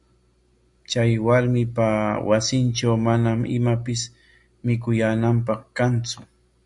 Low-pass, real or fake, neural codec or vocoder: 10.8 kHz; real; none